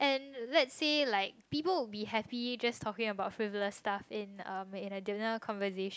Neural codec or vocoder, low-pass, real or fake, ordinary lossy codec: none; none; real; none